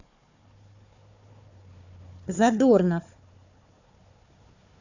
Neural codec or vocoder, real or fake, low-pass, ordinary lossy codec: codec, 16 kHz, 4 kbps, FunCodec, trained on Chinese and English, 50 frames a second; fake; 7.2 kHz; none